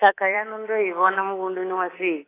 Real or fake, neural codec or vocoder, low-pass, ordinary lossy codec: fake; codec, 24 kHz, 3.1 kbps, DualCodec; 3.6 kHz; AAC, 16 kbps